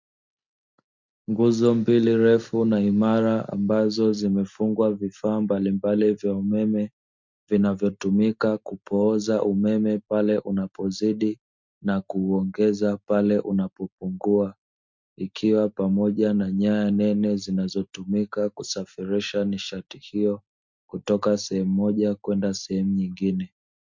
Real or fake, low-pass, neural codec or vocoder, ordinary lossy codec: real; 7.2 kHz; none; MP3, 64 kbps